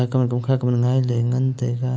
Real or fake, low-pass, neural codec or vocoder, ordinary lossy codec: real; none; none; none